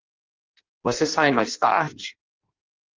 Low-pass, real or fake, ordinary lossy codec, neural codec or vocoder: 7.2 kHz; fake; Opus, 32 kbps; codec, 16 kHz in and 24 kHz out, 0.6 kbps, FireRedTTS-2 codec